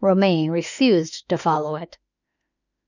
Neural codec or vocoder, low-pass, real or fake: autoencoder, 48 kHz, 32 numbers a frame, DAC-VAE, trained on Japanese speech; 7.2 kHz; fake